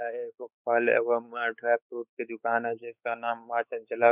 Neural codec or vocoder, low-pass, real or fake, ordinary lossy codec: codec, 16 kHz, 4 kbps, X-Codec, WavLM features, trained on Multilingual LibriSpeech; 3.6 kHz; fake; none